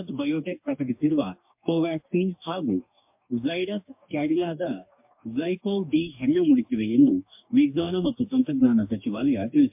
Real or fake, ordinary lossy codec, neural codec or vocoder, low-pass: fake; MP3, 32 kbps; codec, 44.1 kHz, 2.6 kbps, DAC; 3.6 kHz